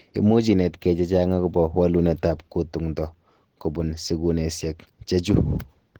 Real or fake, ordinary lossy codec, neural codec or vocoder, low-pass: real; Opus, 16 kbps; none; 19.8 kHz